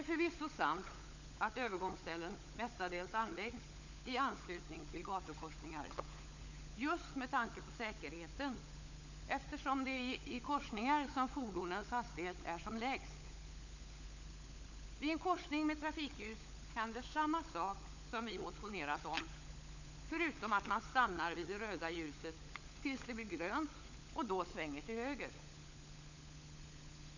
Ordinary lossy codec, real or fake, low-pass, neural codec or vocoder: none; fake; 7.2 kHz; codec, 16 kHz, 16 kbps, FunCodec, trained on LibriTTS, 50 frames a second